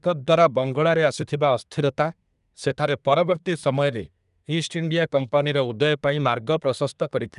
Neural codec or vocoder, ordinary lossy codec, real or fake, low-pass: codec, 24 kHz, 1 kbps, SNAC; none; fake; 10.8 kHz